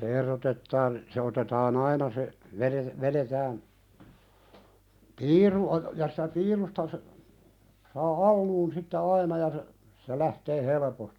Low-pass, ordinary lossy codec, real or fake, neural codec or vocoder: 19.8 kHz; none; real; none